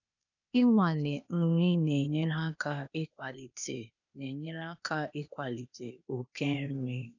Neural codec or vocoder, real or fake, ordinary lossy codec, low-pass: codec, 16 kHz, 0.8 kbps, ZipCodec; fake; none; 7.2 kHz